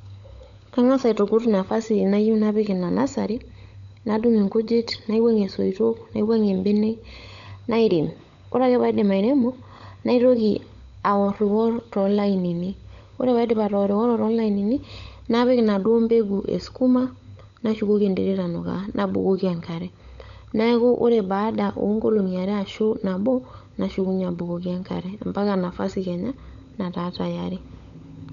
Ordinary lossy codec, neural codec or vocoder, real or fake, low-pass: none; codec, 16 kHz, 16 kbps, FunCodec, trained on LibriTTS, 50 frames a second; fake; 7.2 kHz